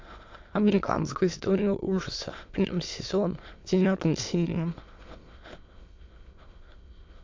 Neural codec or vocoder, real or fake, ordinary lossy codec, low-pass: autoencoder, 22.05 kHz, a latent of 192 numbers a frame, VITS, trained on many speakers; fake; MP3, 48 kbps; 7.2 kHz